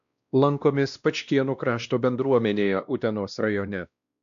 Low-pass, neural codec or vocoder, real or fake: 7.2 kHz; codec, 16 kHz, 1 kbps, X-Codec, WavLM features, trained on Multilingual LibriSpeech; fake